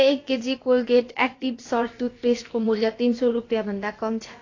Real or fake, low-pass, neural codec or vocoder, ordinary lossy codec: fake; 7.2 kHz; codec, 16 kHz, about 1 kbps, DyCAST, with the encoder's durations; AAC, 32 kbps